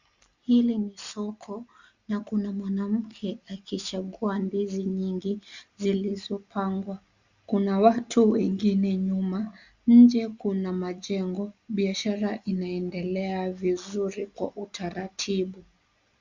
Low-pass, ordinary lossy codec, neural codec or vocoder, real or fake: 7.2 kHz; Opus, 64 kbps; none; real